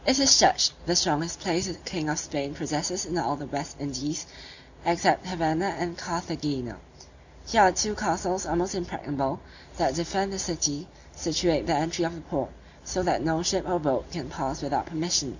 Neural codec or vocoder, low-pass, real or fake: none; 7.2 kHz; real